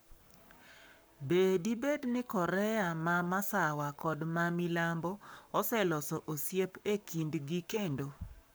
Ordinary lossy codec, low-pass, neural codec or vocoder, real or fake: none; none; codec, 44.1 kHz, 7.8 kbps, Pupu-Codec; fake